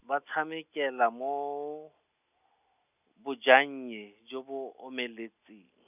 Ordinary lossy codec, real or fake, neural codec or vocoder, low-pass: none; real; none; 3.6 kHz